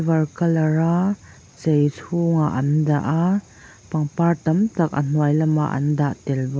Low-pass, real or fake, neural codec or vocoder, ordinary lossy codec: none; real; none; none